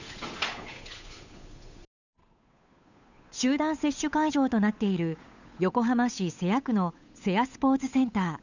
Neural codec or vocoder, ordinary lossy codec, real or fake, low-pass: codec, 16 kHz, 8 kbps, FunCodec, trained on Chinese and English, 25 frames a second; none; fake; 7.2 kHz